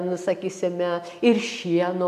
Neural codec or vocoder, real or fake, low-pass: none; real; 14.4 kHz